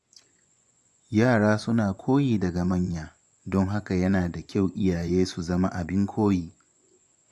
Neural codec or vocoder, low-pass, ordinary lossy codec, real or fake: vocoder, 24 kHz, 100 mel bands, Vocos; none; none; fake